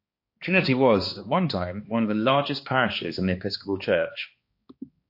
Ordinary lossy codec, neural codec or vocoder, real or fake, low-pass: MP3, 32 kbps; codec, 16 kHz, 2 kbps, X-Codec, HuBERT features, trained on balanced general audio; fake; 5.4 kHz